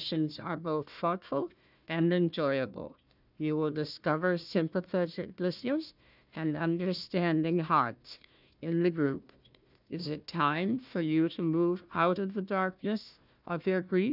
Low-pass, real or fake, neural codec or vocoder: 5.4 kHz; fake; codec, 16 kHz, 1 kbps, FunCodec, trained on Chinese and English, 50 frames a second